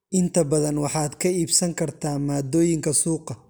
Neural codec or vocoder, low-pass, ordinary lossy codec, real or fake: none; none; none; real